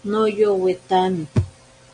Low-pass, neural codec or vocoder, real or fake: 9.9 kHz; none; real